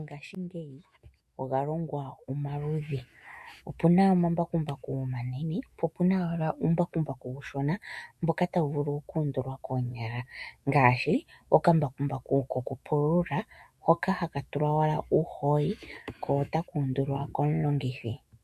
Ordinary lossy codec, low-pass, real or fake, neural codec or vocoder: MP3, 64 kbps; 14.4 kHz; fake; autoencoder, 48 kHz, 128 numbers a frame, DAC-VAE, trained on Japanese speech